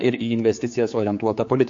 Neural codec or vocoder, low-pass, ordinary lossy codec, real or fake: codec, 16 kHz, 4 kbps, X-Codec, HuBERT features, trained on general audio; 7.2 kHz; MP3, 48 kbps; fake